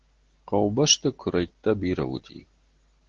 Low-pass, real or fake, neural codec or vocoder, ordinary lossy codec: 7.2 kHz; real; none; Opus, 16 kbps